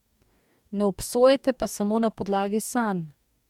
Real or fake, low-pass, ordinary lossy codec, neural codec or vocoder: fake; 19.8 kHz; MP3, 96 kbps; codec, 44.1 kHz, 2.6 kbps, DAC